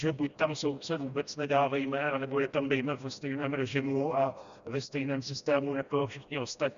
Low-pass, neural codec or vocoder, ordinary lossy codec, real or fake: 7.2 kHz; codec, 16 kHz, 1 kbps, FreqCodec, smaller model; Opus, 64 kbps; fake